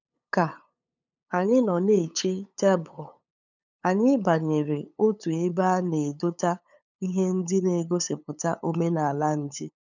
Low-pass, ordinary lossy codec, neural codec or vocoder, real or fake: 7.2 kHz; none; codec, 16 kHz, 8 kbps, FunCodec, trained on LibriTTS, 25 frames a second; fake